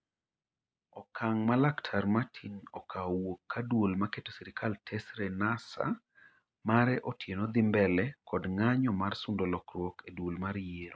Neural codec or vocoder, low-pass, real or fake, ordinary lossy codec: none; none; real; none